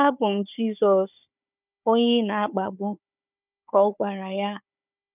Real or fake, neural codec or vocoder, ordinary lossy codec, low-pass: fake; codec, 16 kHz, 4 kbps, FunCodec, trained on Chinese and English, 50 frames a second; none; 3.6 kHz